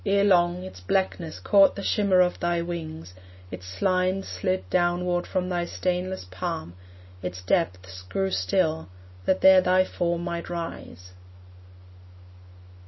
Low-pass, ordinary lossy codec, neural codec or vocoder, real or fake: 7.2 kHz; MP3, 24 kbps; none; real